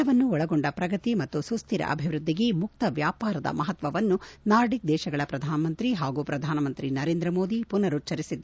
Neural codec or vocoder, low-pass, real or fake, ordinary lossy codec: none; none; real; none